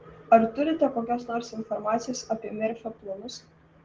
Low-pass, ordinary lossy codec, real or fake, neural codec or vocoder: 7.2 kHz; Opus, 16 kbps; real; none